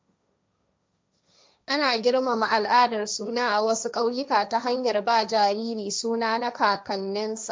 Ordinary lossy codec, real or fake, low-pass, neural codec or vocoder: none; fake; none; codec, 16 kHz, 1.1 kbps, Voila-Tokenizer